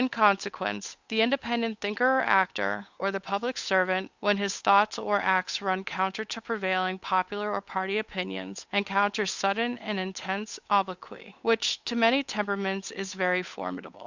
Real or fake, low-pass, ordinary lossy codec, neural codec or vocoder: fake; 7.2 kHz; Opus, 64 kbps; codec, 16 kHz, 8 kbps, FunCodec, trained on Chinese and English, 25 frames a second